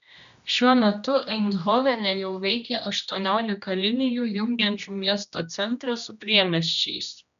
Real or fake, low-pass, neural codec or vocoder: fake; 7.2 kHz; codec, 16 kHz, 1 kbps, X-Codec, HuBERT features, trained on general audio